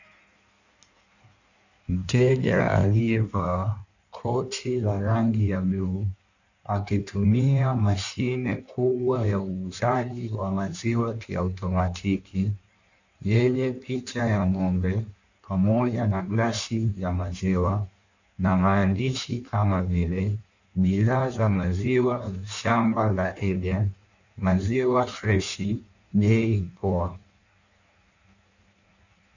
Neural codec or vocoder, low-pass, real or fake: codec, 16 kHz in and 24 kHz out, 1.1 kbps, FireRedTTS-2 codec; 7.2 kHz; fake